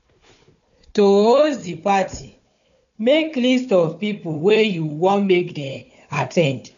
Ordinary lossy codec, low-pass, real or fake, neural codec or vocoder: none; 7.2 kHz; fake; codec, 16 kHz, 4 kbps, FunCodec, trained on Chinese and English, 50 frames a second